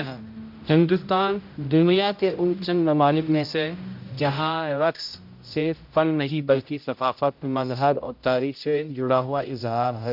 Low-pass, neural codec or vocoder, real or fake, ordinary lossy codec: 5.4 kHz; codec, 16 kHz, 0.5 kbps, X-Codec, HuBERT features, trained on general audio; fake; MP3, 48 kbps